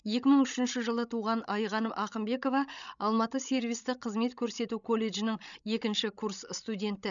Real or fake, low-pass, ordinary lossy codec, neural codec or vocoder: fake; 7.2 kHz; none; codec, 16 kHz, 16 kbps, FreqCodec, larger model